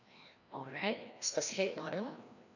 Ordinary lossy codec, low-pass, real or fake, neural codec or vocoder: none; 7.2 kHz; fake; codec, 16 kHz, 1 kbps, FreqCodec, larger model